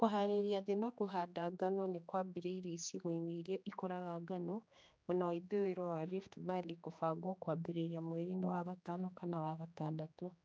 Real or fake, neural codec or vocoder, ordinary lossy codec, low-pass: fake; codec, 16 kHz, 2 kbps, X-Codec, HuBERT features, trained on general audio; none; none